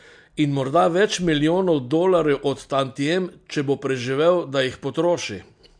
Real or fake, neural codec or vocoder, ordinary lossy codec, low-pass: real; none; MP3, 64 kbps; 9.9 kHz